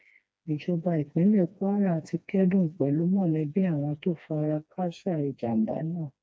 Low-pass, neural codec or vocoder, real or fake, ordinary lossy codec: none; codec, 16 kHz, 2 kbps, FreqCodec, smaller model; fake; none